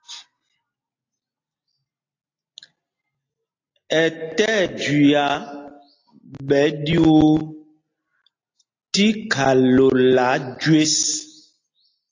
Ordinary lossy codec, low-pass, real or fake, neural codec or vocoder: AAC, 32 kbps; 7.2 kHz; real; none